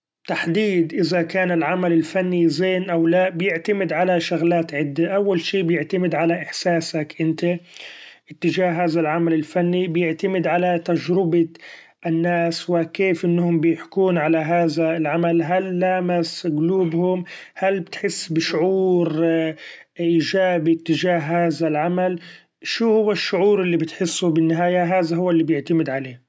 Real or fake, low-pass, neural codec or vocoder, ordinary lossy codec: real; none; none; none